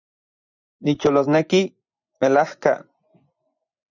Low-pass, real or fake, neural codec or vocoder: 7.2 kHz; fake; vocoder, 24 kHz, 100 mel bands, Vocos